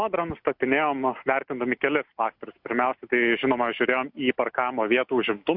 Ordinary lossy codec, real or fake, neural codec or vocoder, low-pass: MP3, 48 kbps; real; none; 5.4 kHz